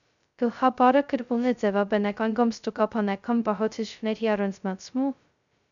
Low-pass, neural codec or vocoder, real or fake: 7.2 kHz; codec, 16 kHz, 0.2 kbps, FocalCodec; fake